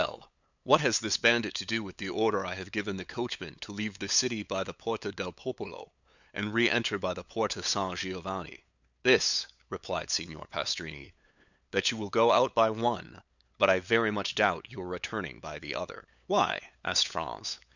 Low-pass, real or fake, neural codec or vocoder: 7.2 kHz; fake; codec, 16 kHz, 8 kbps, FunCodec, trained on LibriTTS, 25 frames a second